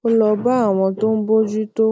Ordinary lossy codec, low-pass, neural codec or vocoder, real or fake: none; none; none; real